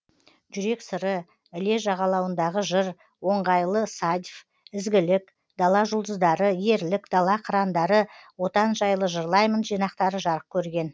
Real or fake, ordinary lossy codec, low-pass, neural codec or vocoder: real; none; none; none